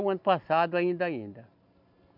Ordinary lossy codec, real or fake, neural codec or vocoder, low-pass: none; real; none; 5.4 kHz